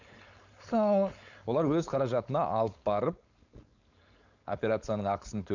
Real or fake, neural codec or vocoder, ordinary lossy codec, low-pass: fake; codec, 16 kHz, 4.8 kbps, FACodec; none; 7.2 kHz